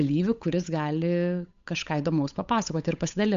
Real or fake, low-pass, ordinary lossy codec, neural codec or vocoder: fake; 7.2 kHz; MP3, 64 kbps; codec, 16 kHz, 8 kbps, FunCodec, trained on Chinese and English, 25 frames a second